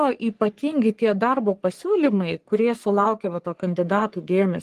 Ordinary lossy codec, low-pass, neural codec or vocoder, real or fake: Opus, 24 kbps; 14.4 kHz; codec, 44.1 kHz, 3.4 kbps, Pupu-Codec; fake